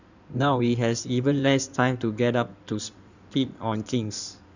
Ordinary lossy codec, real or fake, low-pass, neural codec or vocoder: none; fake; 7.2 kHz; codec, 16 kHz in and 24 kHz out, 2.2 kbps, FireRedTTS-2 codec